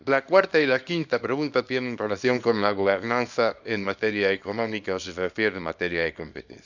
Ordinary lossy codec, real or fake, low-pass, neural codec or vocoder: none; fake; 7.2 kHz; codec, 24 kHz, 0.9 kbps, WavTokenizer, small release